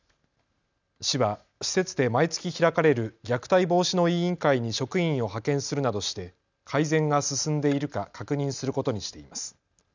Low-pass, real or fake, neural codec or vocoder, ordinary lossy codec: 7.2 kHz; real; none; none